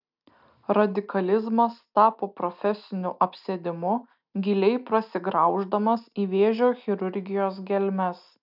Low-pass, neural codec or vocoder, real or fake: 5.4 kHz; none; real